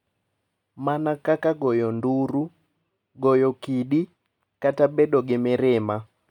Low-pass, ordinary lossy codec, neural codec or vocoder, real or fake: 19.8 kHz; none; none; real